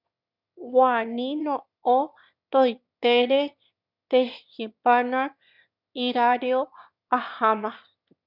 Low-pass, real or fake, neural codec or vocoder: 5.4 kHz; fake; autoencoder, 22.05 kHz, a latent of 192 numbers a frame, VITS, trained on one speaker